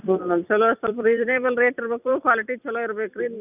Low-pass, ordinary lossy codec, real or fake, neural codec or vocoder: 3.6 kHz; none; fake; vocoder, 44.1 kHz, 128 mel bands every 256 samples, BigVGAN v2